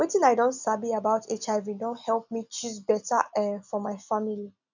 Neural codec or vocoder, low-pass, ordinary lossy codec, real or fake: none; 7.2 kHz; none; real